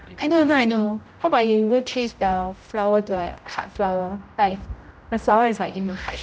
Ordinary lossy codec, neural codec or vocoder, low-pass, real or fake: none; codec, 16 kHz, 0.5 kbps, X-Codec, HuBERT features, trained on general audio; none; fake